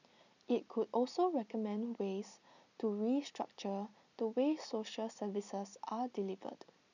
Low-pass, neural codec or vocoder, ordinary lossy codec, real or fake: 7.2 kHz; none; none; real